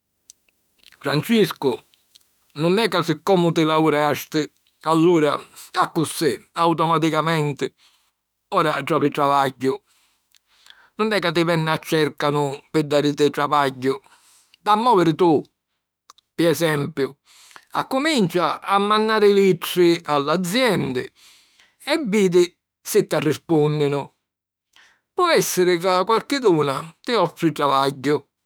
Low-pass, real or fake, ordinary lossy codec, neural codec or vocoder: none; fake; none; autoencoder, 48 kHz, 32 numbers a frame, DAC-VAE, trained on Japanese speech